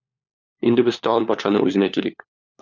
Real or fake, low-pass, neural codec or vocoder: fake; 7.2 kHz; codec, 16 kHz, 4 kbps, FunCodec, trained on LibriTTS, 50 frames a second